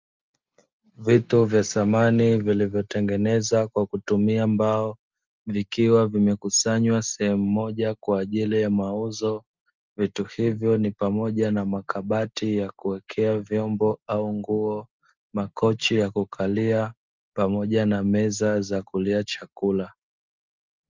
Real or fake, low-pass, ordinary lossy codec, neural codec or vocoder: real; 7.2 kHz; Opus, 24 kbps; none